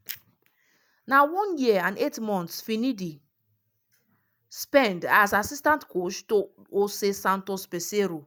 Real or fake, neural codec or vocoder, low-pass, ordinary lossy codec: real; none; none; none